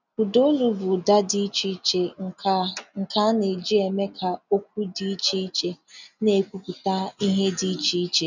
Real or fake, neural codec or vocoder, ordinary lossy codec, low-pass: real; none; none; 7.2 kHz